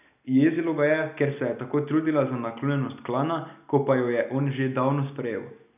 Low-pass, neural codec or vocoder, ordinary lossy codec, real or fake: 3.6 kHz; none; none; real